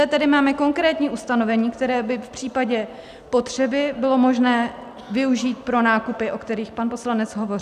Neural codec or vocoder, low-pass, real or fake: none; 14.4 kHz; real